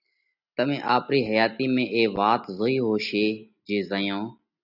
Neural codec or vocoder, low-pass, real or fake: none; 5.4 kHz; real